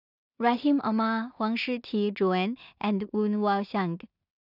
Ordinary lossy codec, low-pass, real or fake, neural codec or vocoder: none; 5.4 kHz; fake; codec, 16 kHz in and 24 kHz out, 0.4 kbps, LongCat-Audio-Codec, two codebook decoder